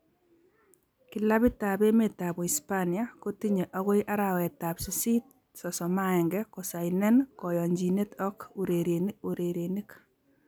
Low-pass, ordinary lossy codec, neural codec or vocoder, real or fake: none; none; none; real